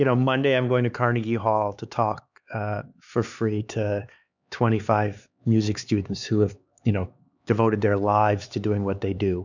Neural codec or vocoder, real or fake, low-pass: codec, 16 kHz, 4 kbps, X-Codec, HuBERT features, trained on LibriSpeech; fake; 7.2 kHz